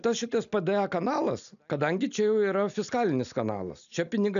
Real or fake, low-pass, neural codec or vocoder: real; 7.2 kHz; none